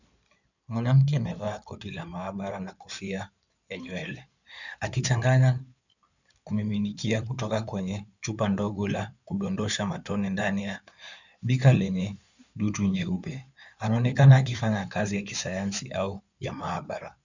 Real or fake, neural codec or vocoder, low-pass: fake; codec, 16 kHz in and 24 kHz out, 2.2 kbps, FireRedTTS-2 codec; 7.2 kHz